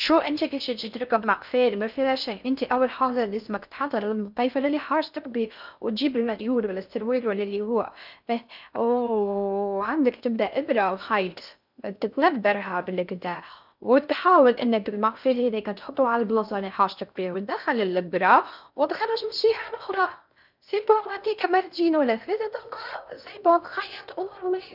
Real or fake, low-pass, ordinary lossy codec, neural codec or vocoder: fake; 5.4 kHz; none; codec, 16 kHz in and 24 kHz out, 0.6 kbps, FocalCodec, streaming, 4096 codes